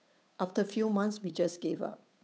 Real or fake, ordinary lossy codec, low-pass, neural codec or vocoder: fake; none; none; codec, 16 kHz, 8 kbps, FunCodec, trained on Chinese and English, 25 frames a second